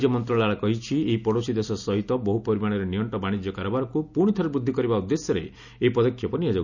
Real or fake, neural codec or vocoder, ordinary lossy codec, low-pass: real; none; none; 7.2 kHz